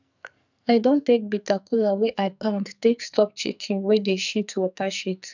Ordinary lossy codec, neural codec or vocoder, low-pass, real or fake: none; codec, 44.1 kHz, 2.6 kbps, SNAC; 7.2 kHz; fake